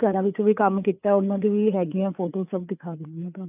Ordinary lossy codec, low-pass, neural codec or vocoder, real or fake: none; 3.6 kHz; codec, 16 kHz, 4 kbps, FreqCodec, larger model; fake